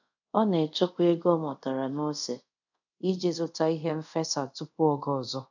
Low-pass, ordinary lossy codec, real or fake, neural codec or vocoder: 7.2 kHz; none; fake; codec, 24 kHz, 0.5 kbps, DualCodec